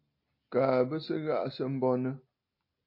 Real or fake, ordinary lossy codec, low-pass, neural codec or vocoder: real; MP3, 32 kbps; 5.4 kHz; none